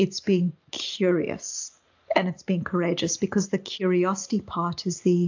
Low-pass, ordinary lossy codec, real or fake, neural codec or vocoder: 7.2 kHz; AAC, 48 kbps; real; none